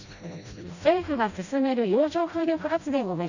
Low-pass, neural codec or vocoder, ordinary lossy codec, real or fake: 7.2 kHz; codec, 16 kHz, 1 kbps, FreqCodec, smaller model; none; fake